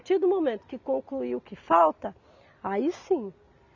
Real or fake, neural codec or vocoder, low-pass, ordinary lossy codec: real; none; 7.2 kHz; none